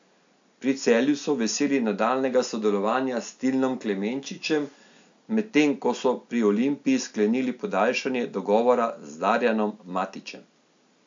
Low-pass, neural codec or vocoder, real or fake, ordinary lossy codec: 7.2 kHz; none; real; AAC, 64 kbps